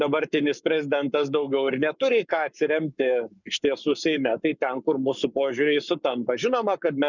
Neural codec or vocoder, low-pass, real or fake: codec, 44.1 kHz, 7.8 kbps, Pupu-Codec; 7.2 kHz; fake